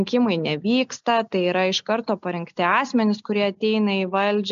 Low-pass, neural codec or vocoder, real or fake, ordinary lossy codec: 7.2 kHz; none; real; MP3, 64 kbps